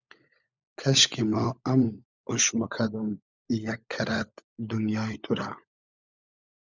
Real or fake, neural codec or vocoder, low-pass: fake; codec, 16 kHz, 16 kbps, FunCodec, trained on LibriTTS, 50 frames a second; 7.2 kHz